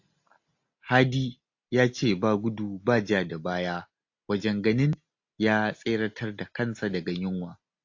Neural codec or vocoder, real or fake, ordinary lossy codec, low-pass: none; real; AAC, 48 kbps; 7.2 kHz